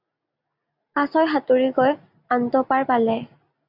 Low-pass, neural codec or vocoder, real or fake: 5.4 kHz; none; real